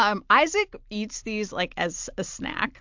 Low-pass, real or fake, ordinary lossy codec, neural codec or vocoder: 7.2 kHz; real; MP3, 64 kbps; none